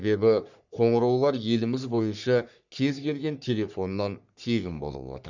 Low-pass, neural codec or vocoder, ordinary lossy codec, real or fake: 7.2 kHz; codec, 44.1 kHz, 3.4 kbps, Pupu-Codec; none; fake